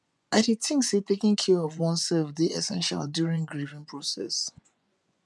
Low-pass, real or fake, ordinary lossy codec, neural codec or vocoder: none; fake; none; vocoder, 24 kHz, 100 mel bands, Vocos